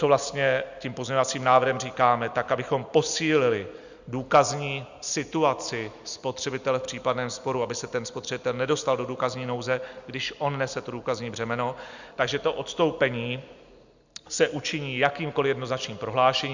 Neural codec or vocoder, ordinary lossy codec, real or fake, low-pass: none; Opus, 64 kbps; real; 7.2 kHz